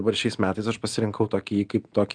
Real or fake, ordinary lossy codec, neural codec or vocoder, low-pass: real; Opus, 32 kbps; none; 9.9 kHz